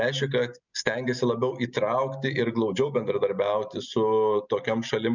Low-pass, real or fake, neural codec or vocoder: 7.2 kHz; real; none